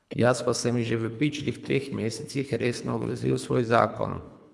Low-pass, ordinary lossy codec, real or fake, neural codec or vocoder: none; none; fake; codec, 24 kHz, 3 kbps, HILCodec